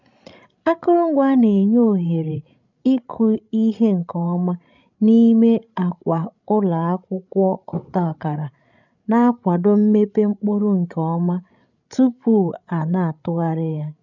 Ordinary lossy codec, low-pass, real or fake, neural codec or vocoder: none; 7.2 kHz; fake; codec, 16 kHz, 16 kbps, FreqCodec, larger model